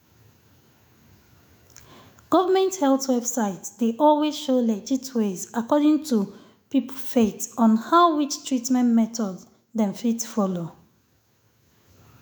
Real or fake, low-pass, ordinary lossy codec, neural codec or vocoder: fake; none; none; autoencoder, 48 kHz, 128 numbers a frame, DAC-VAE, trained on Japanese speech